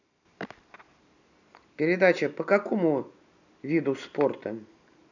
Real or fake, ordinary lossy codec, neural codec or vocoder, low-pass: real; none; none; 7.2 kHz